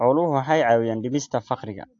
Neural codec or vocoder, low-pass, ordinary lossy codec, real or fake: none; 7.2 kHz; AAC, 48 kbps; real